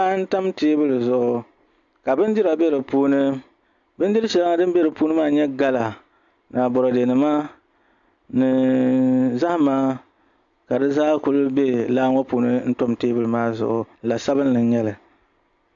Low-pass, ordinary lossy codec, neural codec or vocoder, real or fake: 7.2 kHz; AAC, 64 kbps; none; real